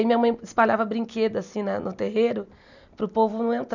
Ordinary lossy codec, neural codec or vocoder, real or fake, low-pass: none; none; real; 7.2 kHz